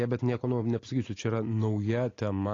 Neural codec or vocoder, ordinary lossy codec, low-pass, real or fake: none; AAC, 32 kbps; 7.2 kHz; real